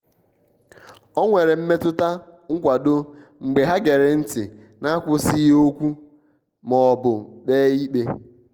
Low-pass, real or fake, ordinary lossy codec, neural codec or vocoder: 19.8 kHz; real; Opus, 24 kbps; none